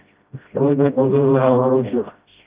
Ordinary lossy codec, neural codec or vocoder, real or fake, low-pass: Opus, 32 kbps; codec, 16 kHz, 0.5 kbps, FreqCodec, smaller model; fake; 3.6 kHz